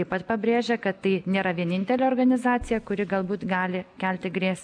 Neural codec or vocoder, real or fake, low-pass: none; real; 9.9 kHz